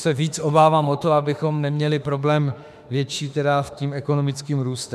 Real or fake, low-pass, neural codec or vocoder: fake; 14.4 kHz; autoencoder, 48 kHz, 32 numbers a frame, DAC-VAE, trained on Japanese speech